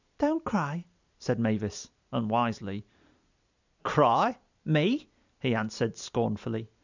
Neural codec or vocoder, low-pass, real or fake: none; 7.2 kHz; real